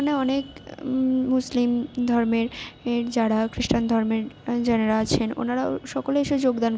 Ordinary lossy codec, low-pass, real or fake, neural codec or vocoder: none; none; real; none